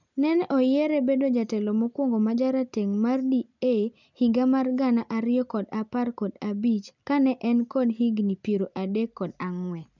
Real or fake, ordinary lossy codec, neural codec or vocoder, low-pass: real; none; none; 7.2 kHz